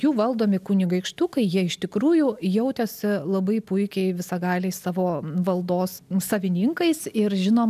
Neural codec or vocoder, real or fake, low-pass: none; real; 14.4 kHz